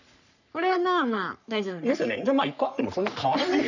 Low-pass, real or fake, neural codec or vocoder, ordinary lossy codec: 7.2 kHz; fake; codec, 44.1 kHz, 3.4 kbps, Pupu-Codec; none